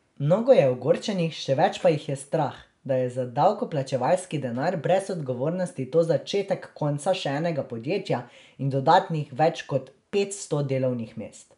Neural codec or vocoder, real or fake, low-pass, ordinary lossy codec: none; real; 10.8 kHz; none